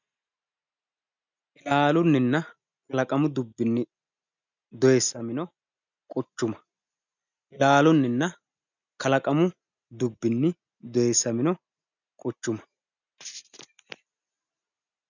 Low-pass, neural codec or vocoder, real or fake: 7.2 kHz; none; real